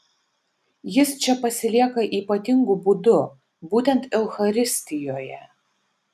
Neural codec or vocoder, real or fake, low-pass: none; real; 14.4 kHz